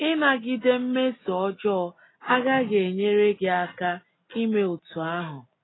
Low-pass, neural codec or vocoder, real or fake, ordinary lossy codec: 7.2 kHz; none; real; AAC, 16 kbps